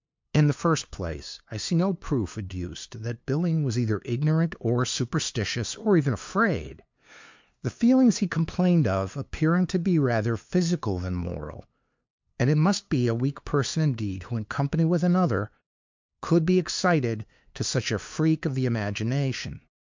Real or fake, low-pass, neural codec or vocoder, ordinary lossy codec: fake; 7.2 kHz; codec, 16 kHz, 2 kbps, FunCodec, trained on LibriTTS, 25 frames a second; MP3, 64 kbps